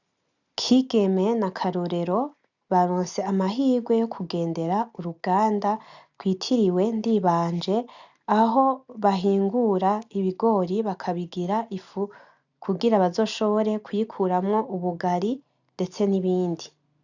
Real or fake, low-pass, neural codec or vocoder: real; 7.2 kHz; none